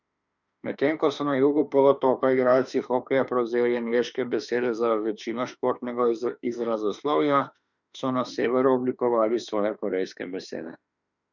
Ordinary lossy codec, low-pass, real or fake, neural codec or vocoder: none; 7.2 kHz; fake; autoencoder, 48 kHz, 32 numbers a frame, DAC-VAE, trained on Japanese speech